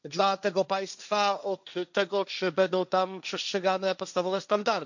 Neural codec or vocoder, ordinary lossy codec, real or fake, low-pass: codec, 16 kHz, 1.1 kbps, Voila-Tokenizer; none; fake; 7.2 kHz